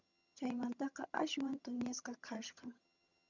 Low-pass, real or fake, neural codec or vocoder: 7.2 kHz; fake; vocoder, 22.05 kHz, 80 mel bands, HiFi-GAN